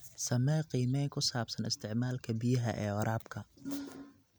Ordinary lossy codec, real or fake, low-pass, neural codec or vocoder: none; real; none; none